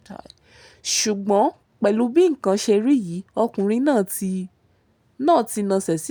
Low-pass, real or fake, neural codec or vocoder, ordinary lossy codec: none; real; none; none